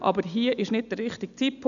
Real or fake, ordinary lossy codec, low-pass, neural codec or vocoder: real; none; 7.2 kHz; none